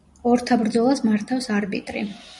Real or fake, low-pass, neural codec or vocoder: real; 10.8 kHz; none